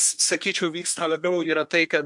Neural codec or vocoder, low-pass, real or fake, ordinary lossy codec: codec, 24 kHz, 1 kbps, SNAC; 10.8 kHz; fake; MP3, 64 kbps